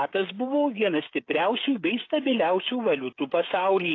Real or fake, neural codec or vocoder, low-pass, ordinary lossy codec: fake; codec, 16 kHz, 16 kbps, FreqCodec, smaller model; 7.2 kHz; AAC, 32 kbps